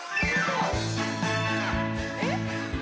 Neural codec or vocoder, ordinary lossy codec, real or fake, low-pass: none; none; real; none